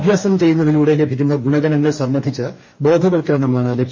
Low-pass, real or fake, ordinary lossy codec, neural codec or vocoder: 7.2 kHz; fake; MP3, 32 kbps; codec, 44.1 kHz, 2.6 kbps, DAC